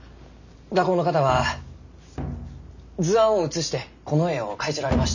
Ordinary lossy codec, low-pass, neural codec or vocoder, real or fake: none; 7.2 kHz; none; real